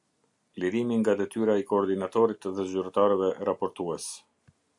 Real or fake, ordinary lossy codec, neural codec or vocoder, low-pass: real; AAC, 64 kbps; none; 10.8 kHz